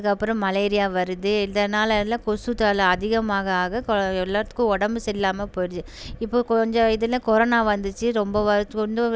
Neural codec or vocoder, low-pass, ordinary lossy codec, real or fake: none; none; none; real